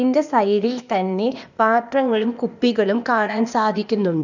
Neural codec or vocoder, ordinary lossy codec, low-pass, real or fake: codec, 16 kHz, 0.8 kbps, ZipCodec; none; 7.2 kHz; fake